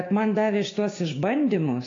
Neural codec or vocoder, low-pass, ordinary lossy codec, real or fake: codec, 16 kHz, 6 kbps, DAC; 7.2 kHz; AAC, 32 kbps; fake